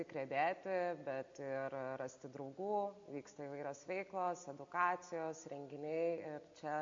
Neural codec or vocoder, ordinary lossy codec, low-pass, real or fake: none; AAC, 64 kbps; 7.2 kHz; real